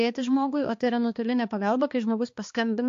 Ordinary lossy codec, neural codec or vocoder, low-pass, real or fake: AAC, 48 kbps; codec, 16 kHz, 2 kbps, X-Codec, HuBERT features, trained on balanced general audio; 7.2 kHz; fake